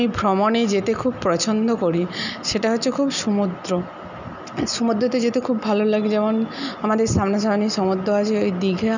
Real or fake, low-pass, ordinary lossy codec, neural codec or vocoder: real; 7.2 kHz; none; none